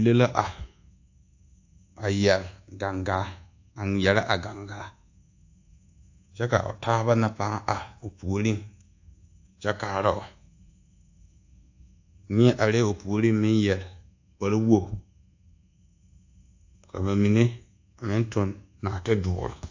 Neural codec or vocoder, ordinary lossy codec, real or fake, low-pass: codec, 24 kHz, 1.2 kbps, DualCodec; AAC, 48 kbps; fake; 7.2 kHz